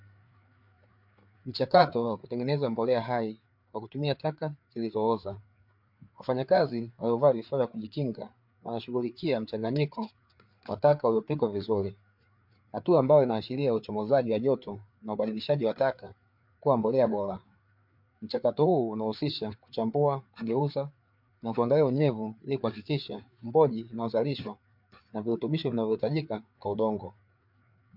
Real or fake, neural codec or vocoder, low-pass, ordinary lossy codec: fake; codec, 16 kHz, 4 kbps, FreqCodec, larger model; 5.4 kHz; MP3, 48 kbps